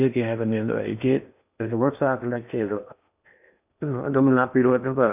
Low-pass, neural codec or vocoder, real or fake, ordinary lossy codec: 3.6 kHz; codec, 16 kHz in and 24 kHz out, 0.8 kbps, FocalCodec, streaming, 65536 codes; fake; none